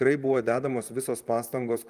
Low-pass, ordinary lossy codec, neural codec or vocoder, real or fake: 14.4 kHz; Opus, 32 kbps; vocoder, 44.1 kHz, 128 mel bands, Pupu-Vocoder; fake